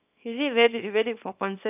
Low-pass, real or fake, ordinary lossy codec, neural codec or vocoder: 3.6 kHz; fake; none; codec, 24 kHz, 0.9 kbps, WavTokenizer, small release